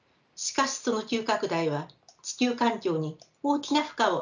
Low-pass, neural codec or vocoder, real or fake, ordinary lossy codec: 7.2 kHz; none; real; none